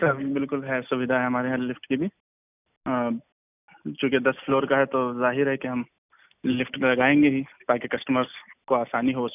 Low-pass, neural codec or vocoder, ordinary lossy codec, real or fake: 3.6 kHz; none; none; real